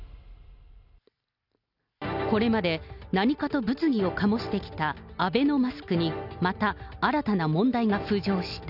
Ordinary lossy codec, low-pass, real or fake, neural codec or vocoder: none; 5.4 kHz; real; none